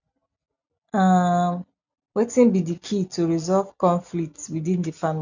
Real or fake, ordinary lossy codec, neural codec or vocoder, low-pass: real; none; none; 7.2 kHz